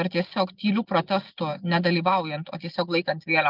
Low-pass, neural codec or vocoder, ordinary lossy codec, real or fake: 5.4 kHz; none; Opus, 32 kbps; real